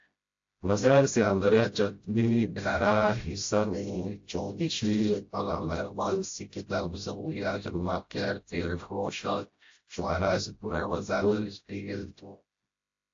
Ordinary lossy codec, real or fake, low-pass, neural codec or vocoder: AAC, 48 kbps; fake; 7.2 kHz; codec, 16 kHz, 0.5 kbps, FreqCodec, smaller model